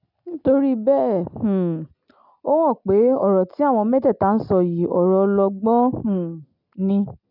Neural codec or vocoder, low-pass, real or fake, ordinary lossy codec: none; 5.4 kHz; real; none